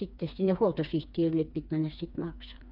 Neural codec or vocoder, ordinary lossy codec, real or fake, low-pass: codec, 16 kHz, 4 kbps, FreqCodec, smaller model; none; fake; 5.4 kHz